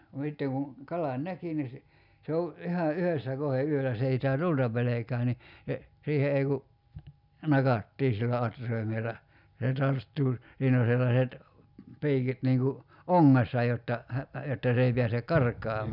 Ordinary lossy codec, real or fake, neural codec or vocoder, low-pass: none; real; none; 5.4 kHz